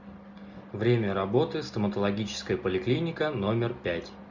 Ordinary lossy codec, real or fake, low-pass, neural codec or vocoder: MP3, 64 kbps; real; 7.2 kHz; none